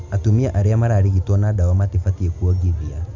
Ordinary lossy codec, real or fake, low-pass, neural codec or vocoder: none; real; 7.2 kHz; none